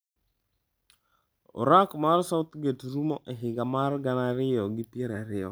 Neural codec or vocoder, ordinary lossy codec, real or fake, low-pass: none; none; real; none